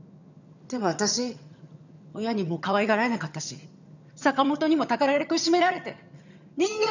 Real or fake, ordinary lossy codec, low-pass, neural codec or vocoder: fake; none; 7.2 kHz; vocoder, 22.05 kHz, 80 mel bands, HiFi-GAN